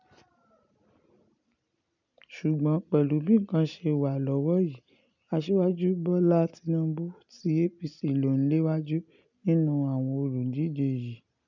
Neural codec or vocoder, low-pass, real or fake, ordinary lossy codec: none; 7.2 kHz; real; none